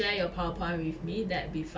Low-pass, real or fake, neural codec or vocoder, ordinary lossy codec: none; real; none; none